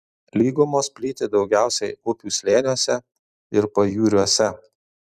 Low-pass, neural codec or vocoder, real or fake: 14.4 kHz; vocoder, 44.1 kHz, 128 mel bands every 256 samples, BigVGAN v2; fake